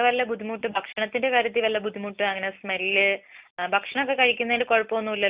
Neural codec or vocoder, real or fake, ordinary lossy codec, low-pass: none; real; Opus, 64 kbps; 3.6 kHz